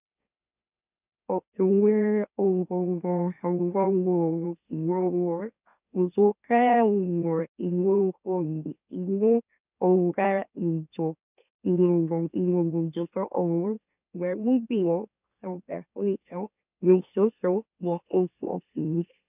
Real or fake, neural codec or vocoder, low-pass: fake; autoencoder, 44.1 kHz, a latent of 192 numbers a frame, MeloTTS; 3.6 kHz